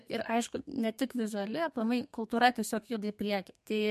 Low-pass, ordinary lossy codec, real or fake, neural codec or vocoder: 14.4 kHz; MP3, 64 kbps; fake; codec, 32 kHz, 1.9 kbps, SNAC